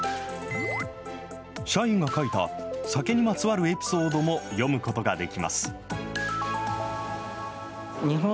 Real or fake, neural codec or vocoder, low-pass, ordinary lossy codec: real; none; none; none